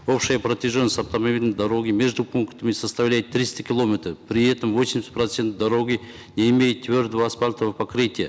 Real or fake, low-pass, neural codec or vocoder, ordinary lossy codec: real; none; none; none